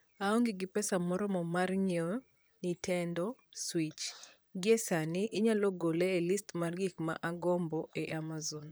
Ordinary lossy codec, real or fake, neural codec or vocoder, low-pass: none; fake; vocoder, 44.1 kHz, 128 mel bands, Pupu-Vocoder; none